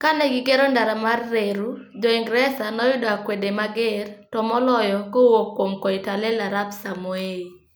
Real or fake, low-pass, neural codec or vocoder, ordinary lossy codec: real; none; none; none